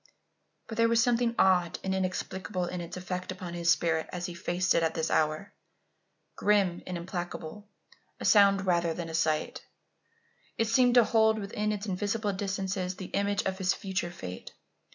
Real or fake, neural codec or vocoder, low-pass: real; none; 7.2 kHz